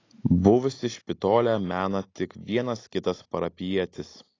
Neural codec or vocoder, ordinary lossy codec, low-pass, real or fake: none; AAC, 32 kbps; 7.2 kHz; real